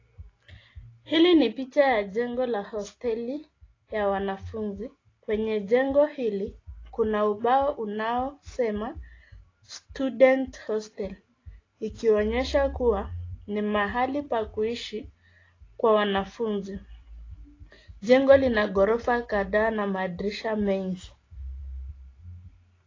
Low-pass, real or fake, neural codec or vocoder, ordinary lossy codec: 7.2 kHz; real; none; AAC, 32 kbps